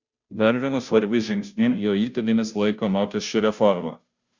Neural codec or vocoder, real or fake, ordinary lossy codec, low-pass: codec, 16 kHz, 0.5 kbps, FunCodec, trained on Chinese and English, 25 frames a second; fake; Opus, 64 kbps; 7.2 kHz